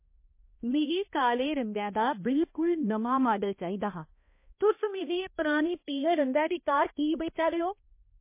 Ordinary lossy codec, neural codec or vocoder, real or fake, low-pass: MP3, 24 kbps; codec, 16 kHz, 1 kbps, X-Codec, HuBERT features, trained on balanced general audio; fake; 3.6 kHz